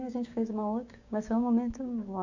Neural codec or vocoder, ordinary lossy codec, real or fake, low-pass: codec, 16 kHz, 6 kbps, DAC; AAC, 48 kbps; fake; 7.2 kHz